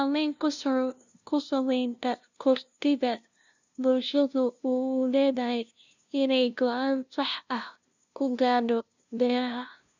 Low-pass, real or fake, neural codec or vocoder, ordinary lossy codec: 7.2 kHz; fake; codec, 16 kHz, 0.5 kbps, FunCodec, trained on LibriTTS, 25 frames a second; none